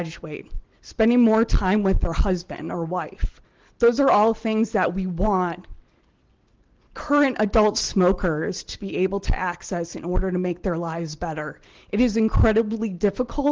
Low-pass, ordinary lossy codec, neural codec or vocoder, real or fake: 7.2 kHz; Opus, 16 kbps; none; real